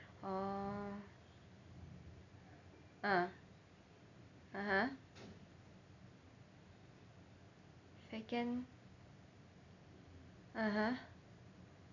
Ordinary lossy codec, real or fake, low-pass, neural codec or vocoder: none; real; 7.2 kHz; none